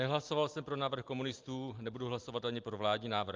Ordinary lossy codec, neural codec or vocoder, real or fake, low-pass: Opus, 32 kbps; none; real; 7.2 kHz